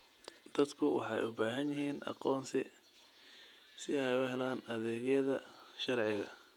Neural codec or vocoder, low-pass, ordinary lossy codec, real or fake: vocoder, 48 kHz, 128 mel bands, Vocos; 19.8 kHz; none; fake